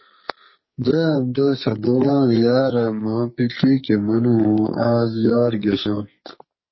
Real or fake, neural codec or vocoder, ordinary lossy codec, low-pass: fake; codec, 44.1 kHz, 2.6 kbps, SNAC; MP3, 24 kbps; 7.2 kHz